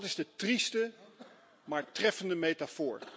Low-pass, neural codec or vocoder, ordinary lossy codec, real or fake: none; none; none; real